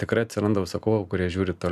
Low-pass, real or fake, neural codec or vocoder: 14.4 kHz; real; none